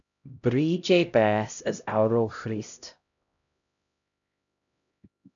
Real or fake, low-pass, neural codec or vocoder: fake; 7.2 kHz; codec, 16 kHz, 0.5 kbps, X-Codec, HuBERT features, trained on LibriSpeech